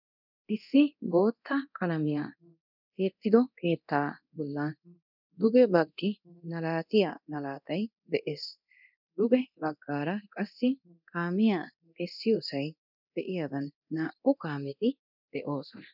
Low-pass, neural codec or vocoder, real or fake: 5.4 kHz; codec, 24 kHz, 0.9 kbps, DualCodec; fake